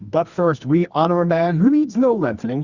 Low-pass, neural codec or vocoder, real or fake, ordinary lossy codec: 7.2 kHz; codec, 24 kHz, 0.9 kbps, WavTokenizer, medium music audio release; fake; Opus, 64 kbps